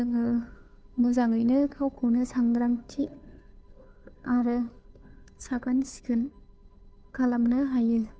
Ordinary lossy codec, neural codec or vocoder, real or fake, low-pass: none; codec, 16 kHz, 2 kbps, FunCodec, trained on Chinese and English, 25 frames a second; fake; none